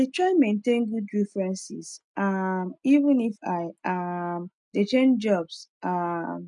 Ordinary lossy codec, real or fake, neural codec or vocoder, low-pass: none; real; none; 10.8 kHz